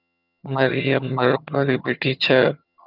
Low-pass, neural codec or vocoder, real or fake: 5.4 kHz; vocoder, 22.05 kHz, 80 mel bands, HiFi-GAN; fake